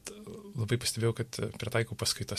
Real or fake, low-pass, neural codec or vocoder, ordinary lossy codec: real; 14.4 kHz; none; MP3, 64 kbps